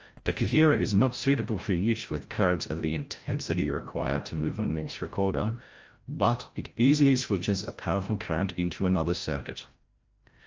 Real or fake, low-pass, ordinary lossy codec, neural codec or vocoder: fake; 7.2 kHz; Opus, 24 kbps; codec, 16 kHz, 0.5 kbps, FreqCodec, larger model